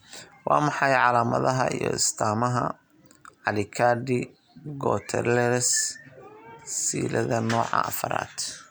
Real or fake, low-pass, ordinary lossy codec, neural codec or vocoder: real; none; none; none